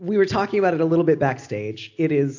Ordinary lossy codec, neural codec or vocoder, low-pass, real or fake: AAC, 48 kbps; none; 7.2 kHz; real